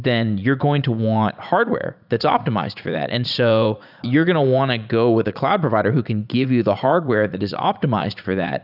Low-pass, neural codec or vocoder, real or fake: 5.4 kHz; vocoder, 44.1 kHz, 80 mel bands, Vocos; fake